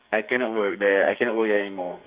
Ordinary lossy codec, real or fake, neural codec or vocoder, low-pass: Opus, 64 kbps; fake; codec, 44.1 kHz, 2.6 kbps, SNAC; 3.6 kHz